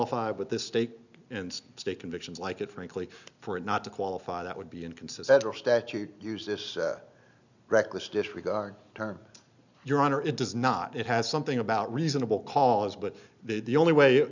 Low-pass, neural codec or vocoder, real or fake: 7.2 kHz; none; real